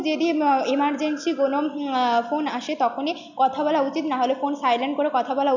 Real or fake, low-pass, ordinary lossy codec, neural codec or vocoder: real; 7.2 kHz; none; none